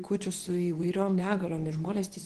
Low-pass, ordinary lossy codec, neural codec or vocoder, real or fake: 10.8 kHz; Opus, 16 kbps; codec, 24 kHz, 0.9 kbps, WavTokenizer, medium speech release version 2; fake